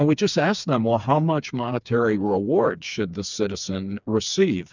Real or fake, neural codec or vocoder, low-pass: fake; codec, 16 kHz, 4 kbps, FreqCodec, smaller model; 7.2 kHz